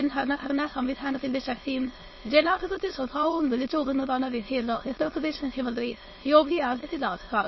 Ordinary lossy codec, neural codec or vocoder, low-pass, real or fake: MP3, 24 kbps; autoencoder, 22.05 kHz, a latent of 192 numbers a frame, VITS, trained on many speakers; 7.2 kHz; fake